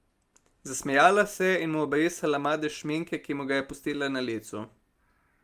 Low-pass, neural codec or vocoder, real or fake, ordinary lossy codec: 14.4 kHz; none; real; Opus, 32 kbps